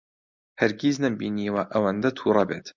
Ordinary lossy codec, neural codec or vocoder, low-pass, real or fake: MP3, 64 kbps; none; 7.2 kHz; real